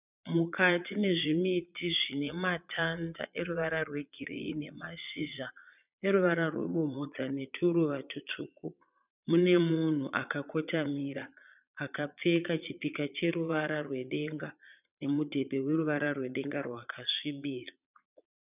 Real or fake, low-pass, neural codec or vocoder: fake; 3.6 kHz; vocoder, 22.05 kHz, 80 mel bands, Vocos